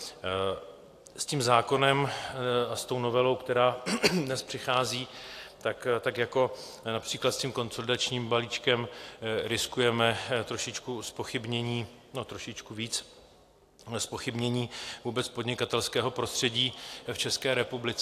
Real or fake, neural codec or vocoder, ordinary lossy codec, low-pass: real; none; AAC, 64 kbps; 14.4 kHz